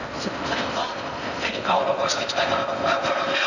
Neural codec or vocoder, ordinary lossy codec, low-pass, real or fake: codec, 16 kHz in and 24 kHz out, 0.6 kbps, FocalCodec, streaming, 4096 codes; none; 7.2 kHz; fake